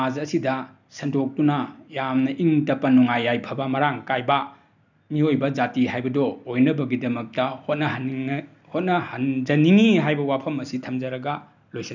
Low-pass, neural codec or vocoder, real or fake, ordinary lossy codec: 7.2 kHz; none; real; none